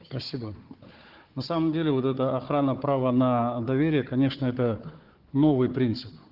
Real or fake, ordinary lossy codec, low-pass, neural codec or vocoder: fake; Opus, 16 kbps; 5.4 kHz; codec, 16 kHz, 4 kbps, FunCodec, trained on Chinese and English, 50 frames a second